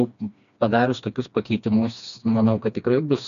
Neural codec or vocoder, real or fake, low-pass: codec, 16 kHz, 2 kbps, FreqCodec, smaller model; fake; 7.2 kHz